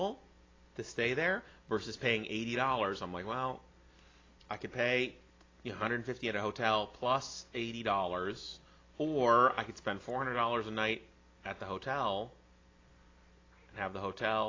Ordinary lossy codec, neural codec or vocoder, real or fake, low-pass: AAC, 32 kbps; none; real; 7.2 kHz